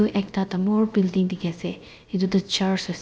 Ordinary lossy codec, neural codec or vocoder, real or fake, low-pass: none; codec, 16 kHz, 0.7 kbps, FocalCodec; fake; none